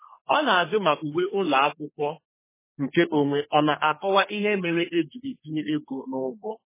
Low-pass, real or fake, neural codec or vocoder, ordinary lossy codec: 3.6 kHz; fake; codec, 32 kHz, 1.9 kbps, SNAC; MP3, 16 kbps